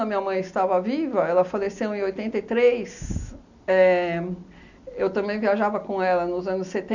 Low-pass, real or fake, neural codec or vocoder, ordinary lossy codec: 7.2 kHz; real; none; none